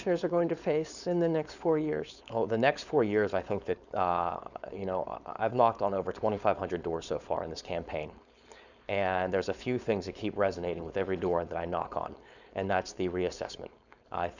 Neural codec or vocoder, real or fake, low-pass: codec, 16 kHz, 4.8 kbps, FACodec; fake; 7.2 kHz